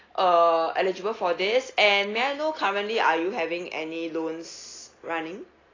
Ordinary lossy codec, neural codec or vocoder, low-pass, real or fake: AAC, 32 kbps; none; 7.2 kHz; real